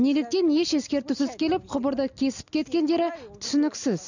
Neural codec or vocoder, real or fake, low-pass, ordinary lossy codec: none; real; 7.2 kHz; none